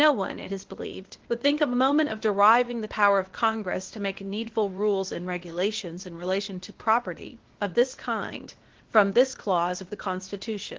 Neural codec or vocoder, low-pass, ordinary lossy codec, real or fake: codec, 16 kHz, 0.8 kbps, ZipCodec; 7.2 kHz; Opus, 16 kbps; fake